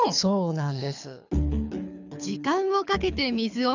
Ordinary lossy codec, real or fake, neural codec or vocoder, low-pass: none; fake; codec, 24 kHz, 6 kbps, HILCodec; 7.2 kHz